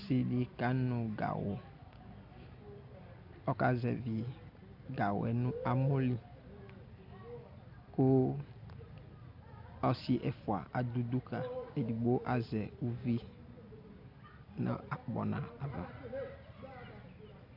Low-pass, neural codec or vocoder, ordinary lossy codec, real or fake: 5.4 kHz; none; AAC, 48 kbps; real